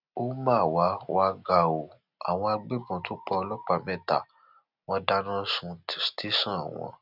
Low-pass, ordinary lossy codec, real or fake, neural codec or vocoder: 5.4 kHz; none; real; none